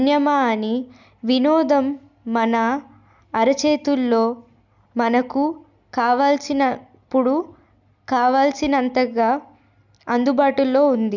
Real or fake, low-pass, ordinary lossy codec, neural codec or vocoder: real; 7.2 kHz; none; none